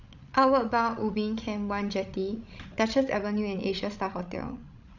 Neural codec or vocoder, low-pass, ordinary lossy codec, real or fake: codec, 16 kHz, 16 kbps, FreqCodec, larger model; 7.2 kHz; none; fake